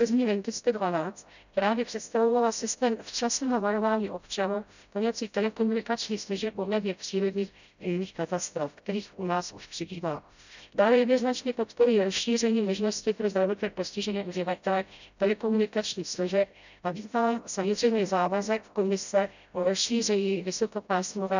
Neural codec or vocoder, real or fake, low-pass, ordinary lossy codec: codec, 16 kHz, 0.5 kbps, FreqCodec, smaller model; fake; 7.2 kHz; none